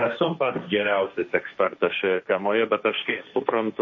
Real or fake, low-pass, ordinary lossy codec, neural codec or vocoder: fake; 7.2 kHz; MP3, 32 kbps; codec, 16 kHz, 1.1 kbps, Voila-Tokenizer